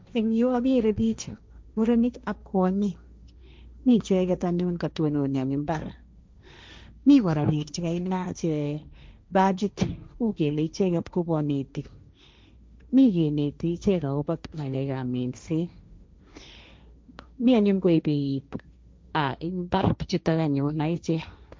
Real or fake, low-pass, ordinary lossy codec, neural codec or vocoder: fake; none; none; codec, 16 kHz, 1.1 kbps, Voila-Tokenizer